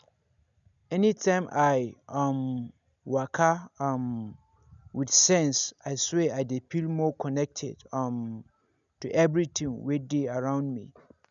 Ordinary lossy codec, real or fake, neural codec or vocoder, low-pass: none; real; none; 7.2 kHz